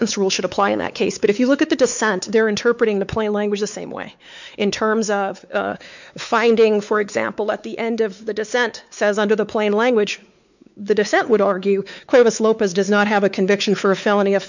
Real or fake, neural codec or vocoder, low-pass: fake; codec, 16 kHz, 4 kbps, X-Codec, WavLM features, trained on Multilingual LibriSpeech; 7.2 kHz